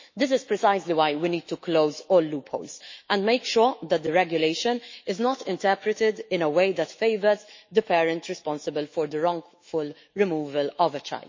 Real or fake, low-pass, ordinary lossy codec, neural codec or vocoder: fake; 7.2 kHz; MP3, 32 kbps; autoencoder, 48 kHz, 128 numbers a frame, DAC-VAE, trained on Japanese speech